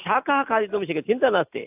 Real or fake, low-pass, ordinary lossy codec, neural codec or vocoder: real; 3.6 kHz; AAC, 32 kbps; none